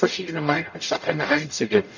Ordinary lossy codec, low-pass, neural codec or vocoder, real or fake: none; 7.2 kHz; codec, 44.1 kHz, 0.9 kbps, DAC; fake